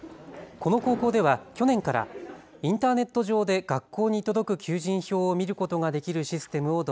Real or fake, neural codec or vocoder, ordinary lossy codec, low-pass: real; none; none; none